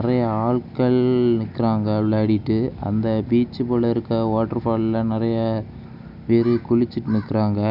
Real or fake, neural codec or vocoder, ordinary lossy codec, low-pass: real; none; none; 5.4 kHz